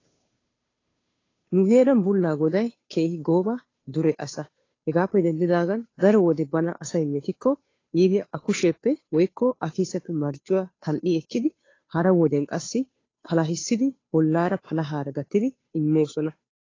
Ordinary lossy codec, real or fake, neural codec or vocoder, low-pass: AAC, 32 kbps; fake; codec, 16 kHz, 2 kbps, FunCodec, trained on Chinese and English, 25 frames a second; 7.2 kHz